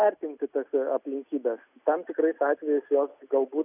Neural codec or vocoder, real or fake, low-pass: none; real; 3.6 kHz